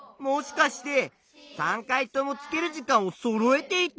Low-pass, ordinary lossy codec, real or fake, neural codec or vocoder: none; none; real; none